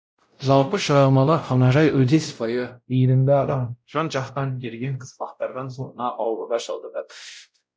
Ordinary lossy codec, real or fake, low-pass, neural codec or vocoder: none; fake; none; codec, 16 kHz, 0.5 kbps, X-Codec, WavLM features, trained on Multilingual LibriSpeech